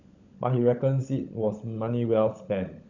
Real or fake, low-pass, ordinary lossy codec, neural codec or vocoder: fake; 7.2 kHz; none; codec, 16 kHz, 16 kbps, FunCodec, trained on LibriTTS, 50 frames a second